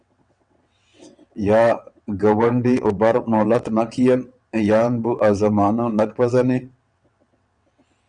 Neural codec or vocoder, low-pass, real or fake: vocoder, 22.05 kHz, 80 mel bands, WaveNeXt; 9.9 kHz; fake